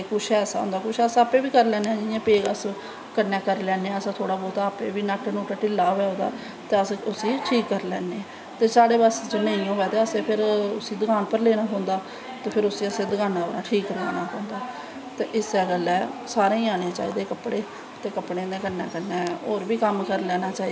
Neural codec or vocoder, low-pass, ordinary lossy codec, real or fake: none; none; none; real